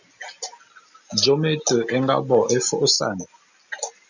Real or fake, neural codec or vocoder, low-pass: real; none; 7.2 kHz